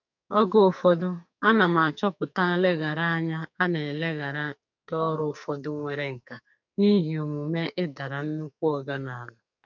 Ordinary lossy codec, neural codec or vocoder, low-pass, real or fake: none; codec, 44.1 kHz, 2.6 kbps, SNAC; 7.2 kHz; fake